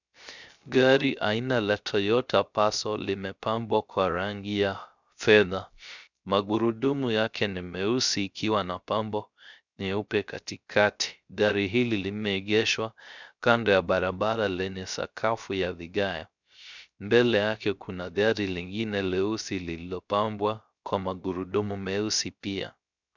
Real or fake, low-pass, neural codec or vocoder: fake; 7.2 kHz; codec, 16 kHz, 0.3 kbps, FocalCodec